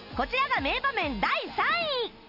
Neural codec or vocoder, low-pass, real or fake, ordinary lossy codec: none; 5.4 kHz; real; Opus, 64 kbps